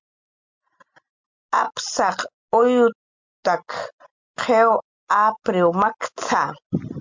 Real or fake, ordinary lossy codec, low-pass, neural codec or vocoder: real; MP3, 64 kbps; 7.2 kHz; none